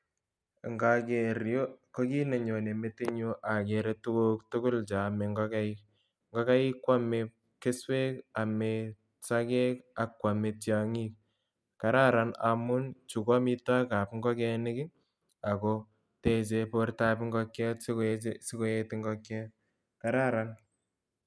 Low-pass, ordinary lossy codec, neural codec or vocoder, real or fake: none; none; none; real